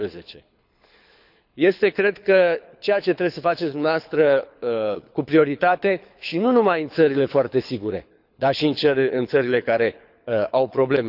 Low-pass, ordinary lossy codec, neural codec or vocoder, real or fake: 5.4 kHz; none; codec, 24 kHz, 6 kbps, HILCodec; fake